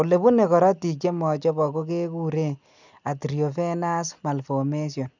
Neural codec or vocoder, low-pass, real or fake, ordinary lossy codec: none; 7.2 kHz; real; none